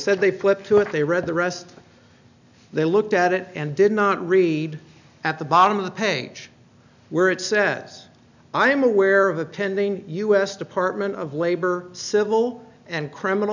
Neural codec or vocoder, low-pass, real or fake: none; 7.2 kHz; real